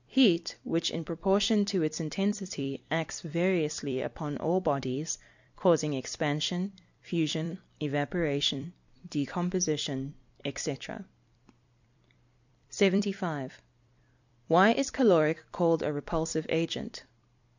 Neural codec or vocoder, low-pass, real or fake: vocoder, 44.1 kHz, 128 mel bands every 256 samples, BigVGAN v2; 7.2 kHz; fake